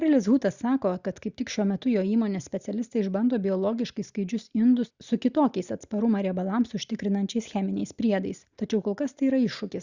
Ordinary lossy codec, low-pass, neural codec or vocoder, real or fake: Opus, 64 kbps; 7.2 kHz; none; real